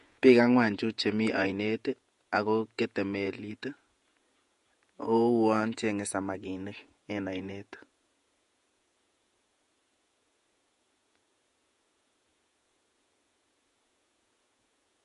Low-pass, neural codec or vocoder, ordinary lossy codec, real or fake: 14.4 kHz; vocoder, 48 kHz, 128 mel bands, Vocos; MP3, 48 kbps; fake